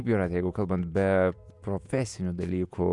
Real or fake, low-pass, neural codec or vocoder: fake; 10.8 kHz; vocoder, 48 kHz, 128 mel bands, Vocos